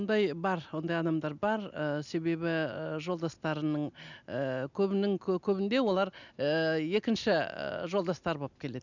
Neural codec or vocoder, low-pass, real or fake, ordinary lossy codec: none; 7.2 kHz; real; none